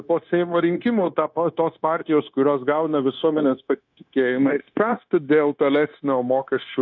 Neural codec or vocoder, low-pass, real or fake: codec, 16 kHz, 0.9 kbps, LongCat-Audio-Codec; 7.2 kHz; fake